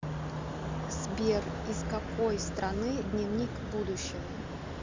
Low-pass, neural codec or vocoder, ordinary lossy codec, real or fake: 7.2 kHz; none; MP3, 64 kbps; real